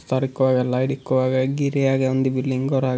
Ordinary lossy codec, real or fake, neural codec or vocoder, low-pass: none; real; none; none